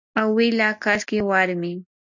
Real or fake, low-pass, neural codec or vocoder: real; 7.2 kHz; none